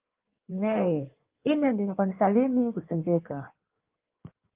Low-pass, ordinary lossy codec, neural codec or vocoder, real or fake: 3.6 kHz; Opus, 24 kbps; codec, 16 kHz in and 24 kHz out, 1.1 kbps, FireRedTTS-2 codec; fake